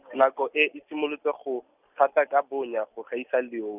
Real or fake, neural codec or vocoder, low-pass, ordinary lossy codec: real; none; 3.6 kHz; none